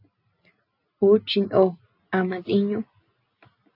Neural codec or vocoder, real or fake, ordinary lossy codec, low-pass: none; real; AAC, 32 kbps; 5.4 kHz